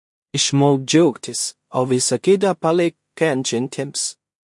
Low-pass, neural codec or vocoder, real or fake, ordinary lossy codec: 10.8 kHz; codec, 16 kHz in and 24 kHz out, 0.4 kbps, LongCat-Audio-Codec, two codebook decoder; fake; MP3, 48 kbps